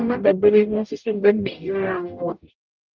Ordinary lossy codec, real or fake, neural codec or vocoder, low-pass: Opus, 24 kbps; fake; codec, 44.1 kHz, 0.9 kbps, DAC; 7.2 kHz